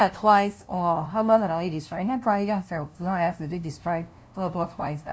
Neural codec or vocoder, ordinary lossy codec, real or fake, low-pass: codec, 16 kHz, 0.5 kbps, FunCodec, trained on LibriTTS, 25 frames a second; none; fake; none